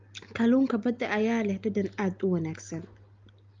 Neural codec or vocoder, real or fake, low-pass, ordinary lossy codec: none; real; 7.2 kHz; Opus, 32 kbps